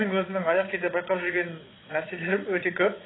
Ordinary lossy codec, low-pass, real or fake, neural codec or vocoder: AAC, 16 kbps; 7.2 kHz; fake; vocoder, 22.05 kHz, 80 mel bands, Vocos